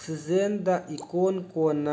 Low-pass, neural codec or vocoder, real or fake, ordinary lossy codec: none; none; real; none